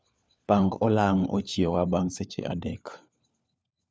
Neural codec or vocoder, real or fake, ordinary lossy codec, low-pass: codec, 16 kHz, 4 kbps, FunCodec, trained on LibriTTS, 50 frames a second; fake; none; none